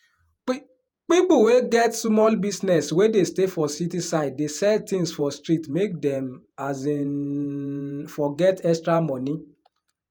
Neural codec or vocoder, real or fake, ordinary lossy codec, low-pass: vocoder, 48 kHz, 128 mel bands, Vocos; fake; none; none